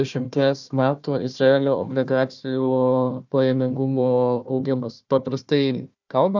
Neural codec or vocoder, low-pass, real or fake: codec, 16 kHz, 1 kbps, FunCodec, trained on Chinese and English, 50 frames a second; 7.2 kHz; fake